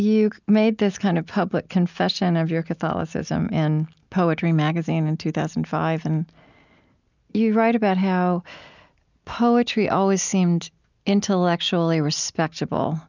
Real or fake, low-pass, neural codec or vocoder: real; 7.2 kHz; none